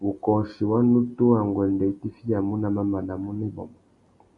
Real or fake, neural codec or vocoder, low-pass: real; none; 10.8 kHz